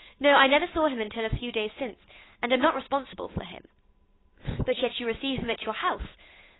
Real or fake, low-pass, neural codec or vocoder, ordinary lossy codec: fake; 7.2 kHz; codec, 16 kHz, 8 kbps, FunCodec, trained on Chinese and English, 25 frames a second; AAC, 16 kbps